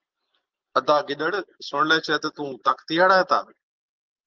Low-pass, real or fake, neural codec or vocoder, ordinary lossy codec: 7.2 kHz; real; none; Opus, 32 kbps